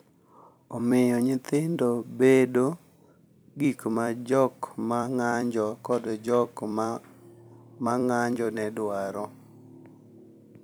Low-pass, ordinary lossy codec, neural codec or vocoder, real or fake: none; none; vocoder, 44.1 kHz, 128 mel bands every 256 samples, BigVGAN v2; fake